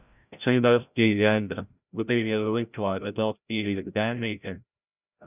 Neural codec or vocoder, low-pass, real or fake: codec, 16 kHz, 0.5 kbps, FreqCodec, larger model; 3.6 kHz; fake